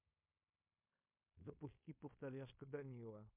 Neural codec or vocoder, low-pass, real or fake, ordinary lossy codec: codec, 16 kHz in and 24 kHz out, 0.9 kbps, LongCat-Audio-Codec, fine tuned four codebook decoder; 3.6 kHz; fake; MP3, 32 kbps